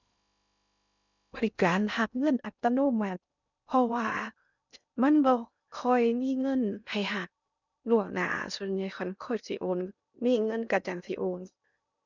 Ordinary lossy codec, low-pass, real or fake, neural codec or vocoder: none; 7.2 kHz; fake; codec, 16 kHz in and 24 kHz out, 0.6 kbps, FocalCodec, streaming, 2048 codes